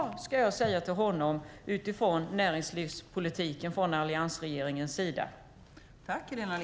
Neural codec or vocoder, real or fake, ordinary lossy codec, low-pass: none; real; none; none